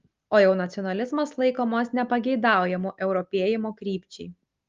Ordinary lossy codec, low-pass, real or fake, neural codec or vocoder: Opus, 32 kbps; 7.2 kHz; real; none